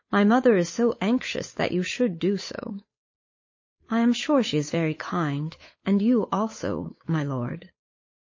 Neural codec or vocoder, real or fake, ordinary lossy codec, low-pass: codec, 16 kHz, 8 kbps, FunCodec, trained on Chinese and English, 25 frames a second; fake; MP3, 32 kbps; 7.2 kHz